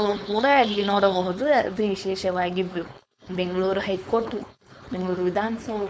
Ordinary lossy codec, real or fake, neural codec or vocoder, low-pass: none; fake; codec, 16 kHz, 4.8 kbps, FACodec; none